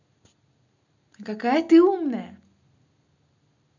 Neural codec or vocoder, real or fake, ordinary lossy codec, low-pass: none; real; none; 7.2 kHz